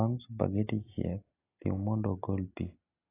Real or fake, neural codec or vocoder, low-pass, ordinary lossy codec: real; none; 3.6 kHz; AAC, 24 kbps